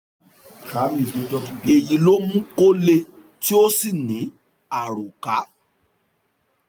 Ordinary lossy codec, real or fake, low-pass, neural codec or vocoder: none; real; none; none